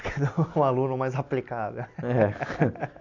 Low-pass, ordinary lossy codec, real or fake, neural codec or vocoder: 7.2 kHz; AAC, 48 kbps; real; none